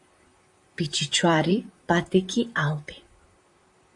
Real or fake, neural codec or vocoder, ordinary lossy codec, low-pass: fake; vocoder, 44.1 kHz, 128 mel bands, Pupu-Vocoder; AAC, 64 kbps; 10.8 kHz